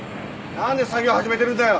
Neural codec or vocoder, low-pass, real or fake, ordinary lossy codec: none; none; real; none